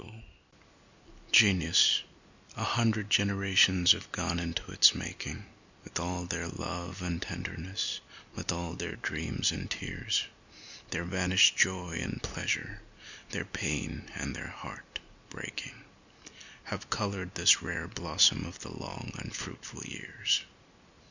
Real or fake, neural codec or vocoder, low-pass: real; none; 7.2 kHz